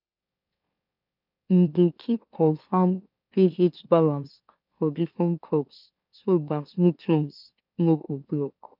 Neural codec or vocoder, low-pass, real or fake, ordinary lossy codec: autoencoder, 44.1 kHz, a latent of 192 numbers a frame, MeloTTS; 5.4 kHz; fake; none